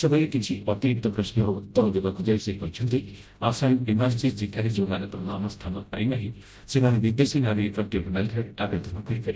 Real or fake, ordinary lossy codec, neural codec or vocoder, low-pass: fake; none; codec, 16 kHz, 0.5 kbps, FreqCodec, smaller model; none